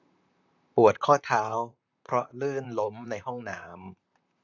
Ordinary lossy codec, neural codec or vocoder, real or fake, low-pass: none; vocoder, 44.1 kHz, 128 mel bands, Pupu-Vocoder; fake; 7.2 kHz